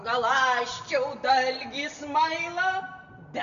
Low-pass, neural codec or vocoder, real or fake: 7.2 kHz; none; real